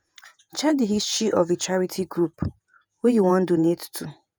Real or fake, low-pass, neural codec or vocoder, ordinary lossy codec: fake; none; vocoder, 48 kHz, 128 mel bands, Vocos; none